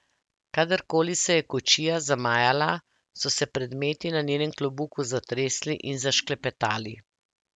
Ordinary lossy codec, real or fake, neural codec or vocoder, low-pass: none; real; none; none